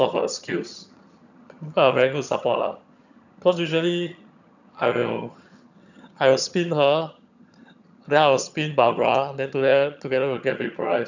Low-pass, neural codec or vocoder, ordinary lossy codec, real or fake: 7.2 kHz; vocoder, 22.05 kHz, 80 mel bands, HiFi-GAN; none; fake